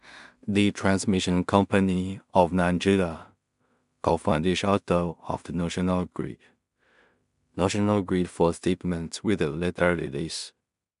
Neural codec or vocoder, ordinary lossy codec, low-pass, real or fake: codec, 16 kHz in and 24 kHz out, 0.4 kbps, LongCat-Audio-Codec, two codebook decoder; none; 10.8 kHz; fake